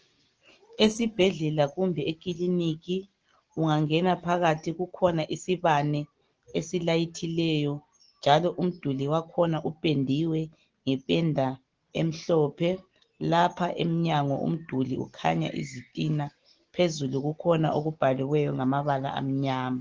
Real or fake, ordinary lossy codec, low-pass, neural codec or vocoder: real; Opus, 16 kbps; 7.2 kHz; none